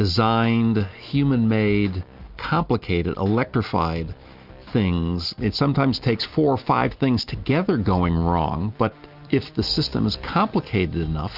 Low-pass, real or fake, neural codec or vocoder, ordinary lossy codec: 5.4 kHz; real; none; Opus, 64 kbps